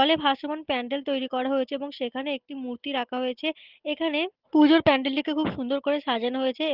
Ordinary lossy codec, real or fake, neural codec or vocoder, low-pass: Opus, 16 kbps; real; none; 5.4 kHz